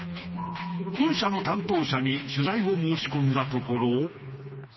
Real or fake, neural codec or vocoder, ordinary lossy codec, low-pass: fake; codec, 16 kHz, 2 kbps, FreqCodec, smaller model; MP3, 24 kbps; 7.2 kHz